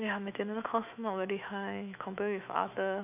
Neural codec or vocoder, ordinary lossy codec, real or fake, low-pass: autoencoder, 48 kHz, 128 numbers a frame, DAC-VAE, trained on Japanese speech; none; fake; 3.6 kHz